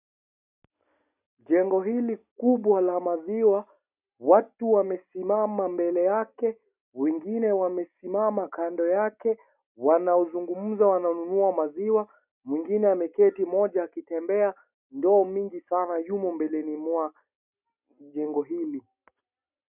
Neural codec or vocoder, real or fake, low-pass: none; real; 3.6 kHz